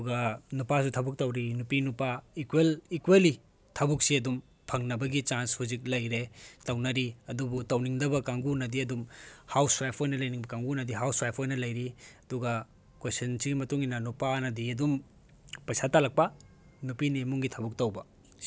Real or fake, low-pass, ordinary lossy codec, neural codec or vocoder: real; none; none; none